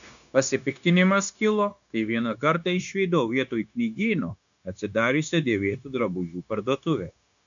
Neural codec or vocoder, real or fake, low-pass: codec, 16 kHz, 0.9 kbps, LongCat-Audio-Codec; fake; 7.2 kHz